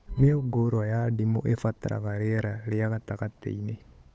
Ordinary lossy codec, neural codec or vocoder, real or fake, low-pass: none; codec, 16 kHz, 8 kbps, FunCodec, trained on Chinese and English, 25 frames a second; fake; none